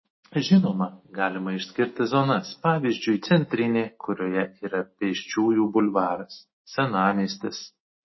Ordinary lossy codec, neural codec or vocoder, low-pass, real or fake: MP3, 24 kbps; none; 7.2 kHz; real